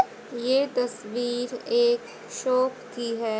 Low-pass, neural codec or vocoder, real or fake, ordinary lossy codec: none; none; real; none